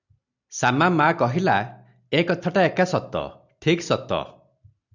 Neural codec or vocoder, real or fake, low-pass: none; real; 7.2 kHz